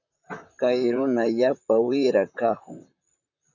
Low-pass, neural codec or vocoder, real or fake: 7.2 kHz; vocoder, 44.1 kHz, 128 mel bands, Pupu-Vocoder; fake